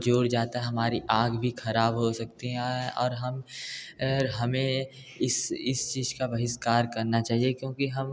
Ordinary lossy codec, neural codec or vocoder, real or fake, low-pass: none; none; real; none